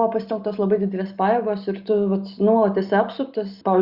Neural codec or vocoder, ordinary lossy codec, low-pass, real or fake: none; Opus, 64 kbps; 5.4 kHz; real